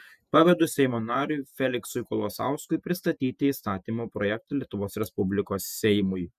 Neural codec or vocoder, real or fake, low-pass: vocoder, 48 kHz, 128 mel bands, Vocos; fake; 14.4 kHz